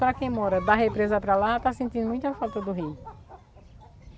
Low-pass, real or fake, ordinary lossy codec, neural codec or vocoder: none; real; none; none